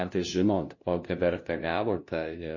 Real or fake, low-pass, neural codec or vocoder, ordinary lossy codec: fake; 7.2 kHz; codec, 16 kHz, 0.5 kbps, FunCodec, trained on LibriTTS, 25 frames a second; MP3, 32 kbps